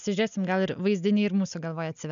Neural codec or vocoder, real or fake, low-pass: none; real; 7.2 kHz